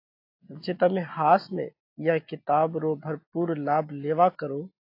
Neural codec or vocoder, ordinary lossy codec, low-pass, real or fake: none; AAC, 32 kbps; 5.4 kHz; real